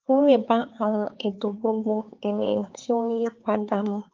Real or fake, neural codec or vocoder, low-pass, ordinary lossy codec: fake; codec, 16 kHz, 4 kbps, X-Codec, HuBERT features, trained on LibriSpeech; 7.2 kHz; Opus, 32 kbps